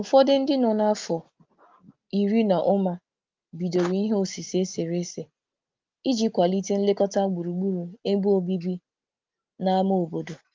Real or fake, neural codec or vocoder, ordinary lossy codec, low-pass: real; none; Opus, 32 kbps; 7.2 kHz